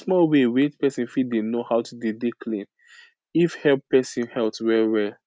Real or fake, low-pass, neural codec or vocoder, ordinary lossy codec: real; none; none; none